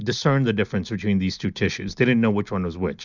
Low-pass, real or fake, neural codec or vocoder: 7.2 kHz; real; none